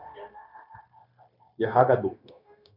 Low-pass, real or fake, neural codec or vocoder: 5.4 kHz; fake; codec, 16 kHz, 0.9 kbps, LongCat-Audio-Codec